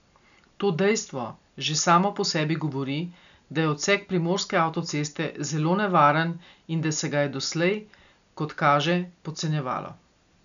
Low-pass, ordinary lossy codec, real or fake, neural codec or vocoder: 7.2 kHz; none; real; none